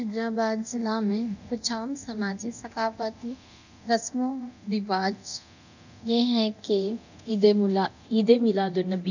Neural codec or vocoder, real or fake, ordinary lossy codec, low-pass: codec, 24 kHz, 0.9 kbps, DualCodec; fake; none; 7.2 kHz